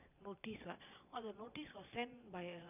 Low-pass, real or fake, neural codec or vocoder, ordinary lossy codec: 3.6 kHz; fake; vocoder, 22.05 kHz, 80 mel bands, Vocos; none